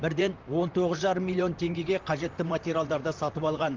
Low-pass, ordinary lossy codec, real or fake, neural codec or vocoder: 7.2 kHz; Opus, 32 kbps; fake; vocoder, 44.1 kHz, 128 mel bands, Pupu-Vocoder